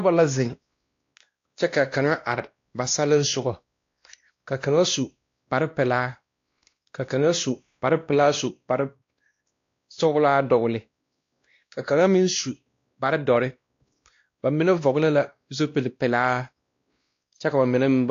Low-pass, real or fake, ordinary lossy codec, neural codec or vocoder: 7.2 kHz; fake; AAC, 48 kbps; codec, 16 kHz, 1 kbps, X-Codec, WavLM features, trained on Multilingual LibriSpeech